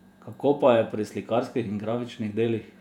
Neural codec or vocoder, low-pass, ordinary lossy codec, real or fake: vocoder, 48 kHz, 128 mel bands, Vocos; 19.8 kHz; none; fake